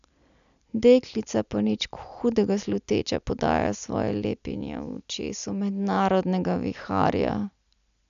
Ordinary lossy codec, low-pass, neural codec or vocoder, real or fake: none; 7.2 kHz; none; real